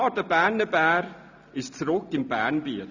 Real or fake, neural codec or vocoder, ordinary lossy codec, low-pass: real; none; none; 7.2 kHz